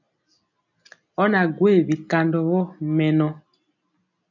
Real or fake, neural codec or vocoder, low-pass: real; none; 7.2 kHz